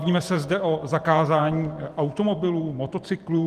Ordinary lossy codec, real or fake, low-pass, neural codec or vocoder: Opus, 24 kbps; fake; 14.4 kHz; vocoder, 44.1 kHz, 128 mel bands every 512 samples, BigVGAN v2